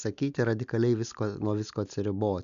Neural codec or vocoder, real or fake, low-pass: none; real; 7.2 kHz